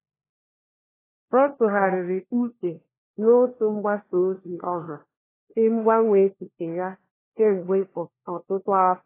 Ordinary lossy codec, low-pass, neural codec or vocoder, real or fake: AAC, 16 kbps; 3.6 kHz; codec, 16 kHz, 1 kbps, FunCodec, trained on LibriTTS, 50 frames a second; fake